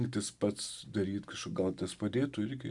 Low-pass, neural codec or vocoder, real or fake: 10.8 kHz; none; real